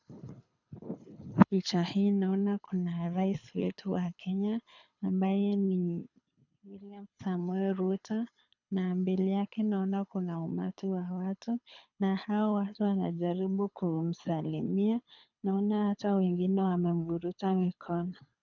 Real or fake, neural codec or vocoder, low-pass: fake; codec, 24 kHz, 6 kbps, HILCodec; 7.2 kHz